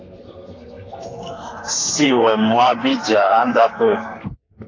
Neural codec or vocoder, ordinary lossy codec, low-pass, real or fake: codec, 32 kHz, 1.9 kbps, SNAC; AAC, 32 kbps; 7.2 kHz; fake